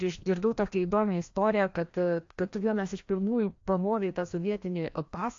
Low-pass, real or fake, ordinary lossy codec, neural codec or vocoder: 7.2 kHz; fake; AAC, 48 kbps; codec, 16 kHz, 1 kbps, FreqCodec, larger model